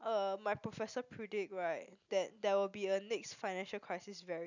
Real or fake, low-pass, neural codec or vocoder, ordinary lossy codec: real; 7.2 kHz; none; none